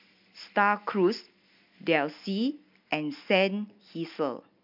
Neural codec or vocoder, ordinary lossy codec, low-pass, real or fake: none; MP3, 48 kbps; 5.4 kHz; real